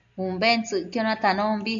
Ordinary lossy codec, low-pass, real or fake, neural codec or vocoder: AAC, 64 kbps; 7.2 kHz; real; none